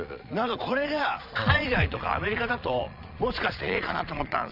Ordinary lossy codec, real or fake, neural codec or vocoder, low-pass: none; fake; vocoder, 22.05 kHz, 80 mel bands, Vocos; 5.4 kHz